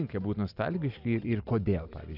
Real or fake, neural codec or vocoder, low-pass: real; none; 5.4 kHz